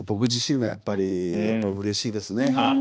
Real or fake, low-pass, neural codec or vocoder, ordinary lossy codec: fake; none; codec, 16 kHz, 2 kbps, X-Codec, HuBERT features, trained on balanced general audio; none